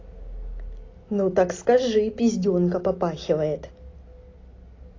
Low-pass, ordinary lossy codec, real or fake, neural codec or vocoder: 7.2 kHz; AAC, 32 kbps; real; none